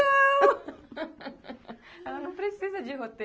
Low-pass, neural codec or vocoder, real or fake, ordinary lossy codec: none; none; real; none